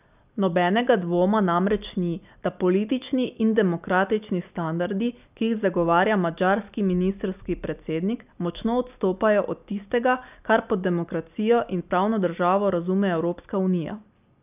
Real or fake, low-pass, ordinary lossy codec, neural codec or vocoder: real; 3.6 kHz; none; none